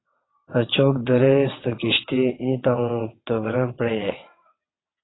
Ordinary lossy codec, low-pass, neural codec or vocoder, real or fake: AAC, 16 kbps; 7.2 kHz; vocoder, 22.05 kHz, 80 mel bands, WaveNeXt; fake